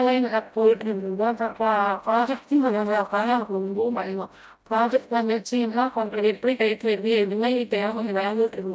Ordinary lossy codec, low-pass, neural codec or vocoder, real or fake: none; none; codec, 16 kHz, 0.5 kbps, FreqCodec, smaller model; fake